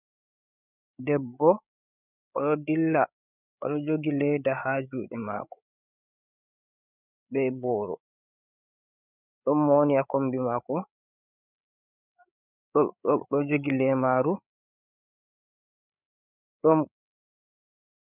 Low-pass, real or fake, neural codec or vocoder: 3.6 kHz; fake; codec, 16 kHz, 8 kbps, FreqCodec, larger model